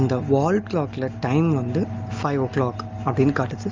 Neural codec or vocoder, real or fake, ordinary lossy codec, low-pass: none; real; Opus, 32 kbps; 7.2 kHz